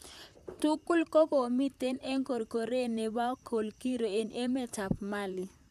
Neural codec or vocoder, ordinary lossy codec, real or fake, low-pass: vocoder, 44.1 kHz, 128 mel bands, Pupu-Vocoder; none; fake; 14.4 kHz